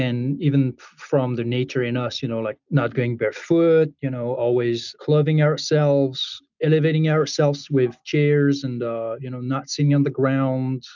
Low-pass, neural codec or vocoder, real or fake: 7.2 kHz; none; real